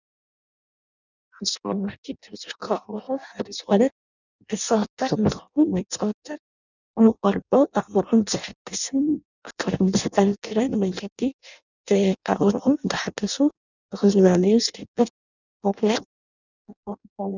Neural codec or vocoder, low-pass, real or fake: codec, 16 kHz in and 24 kHz out, 0.6 kbps, FireRedTTS-2 codec; 7.2 kHz; fake